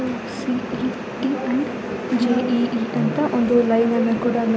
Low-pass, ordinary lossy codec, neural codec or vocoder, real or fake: none; none; none; real